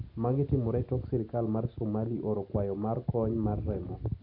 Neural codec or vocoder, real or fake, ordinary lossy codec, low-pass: none; real; none; 5.4 kHz